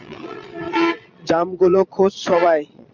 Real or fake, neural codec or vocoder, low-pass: fake; vocoder, 22.05 kHz, 80 mel bands, Vocos; 7.2 kHz